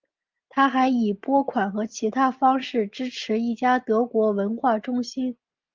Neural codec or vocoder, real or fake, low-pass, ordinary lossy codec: none; real; 7.2 kHz; Opus, 32 kbps